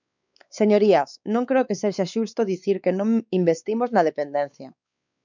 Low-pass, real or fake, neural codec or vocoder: 7.2 kHz; fake; codec, 16 kHz, 4 kbps, X-Codec, WavLM features, trained on Multilingual LibriSpeech